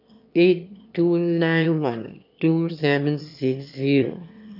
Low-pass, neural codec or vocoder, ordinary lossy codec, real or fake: 5.4 kHz; autoencoder, 22.05 kHz, a latent of 192 numbers a frame, VITS, trained on one speaker; none; fake